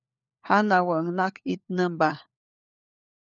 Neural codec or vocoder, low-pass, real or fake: codec, 16 kHz, 4 kbps, FunCodec, trained on LibriTTS, 50 frames a second; 7.2 kHz; fake